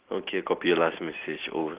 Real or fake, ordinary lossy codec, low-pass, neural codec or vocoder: real; Opus, 16 kbps; 3.6 kHz; none